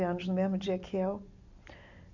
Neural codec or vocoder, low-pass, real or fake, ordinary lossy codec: none; 7.2 kHz; real; none